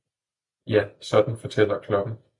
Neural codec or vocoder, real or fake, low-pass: none; real; 10.8 kHz